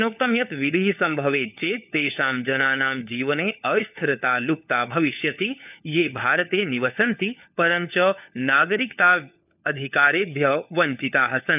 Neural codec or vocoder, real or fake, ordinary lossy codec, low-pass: codec, 16 kHz, 4 kbps, FunCodec, trained on LibriTTS, 50 frames a second; fake; none; 3.6 kHz